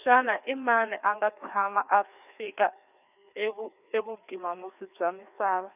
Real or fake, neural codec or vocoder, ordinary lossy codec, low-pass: fake; codec, 16 kHz, 2 kbps, FreqCodec, larger model; none; 3.6 kHz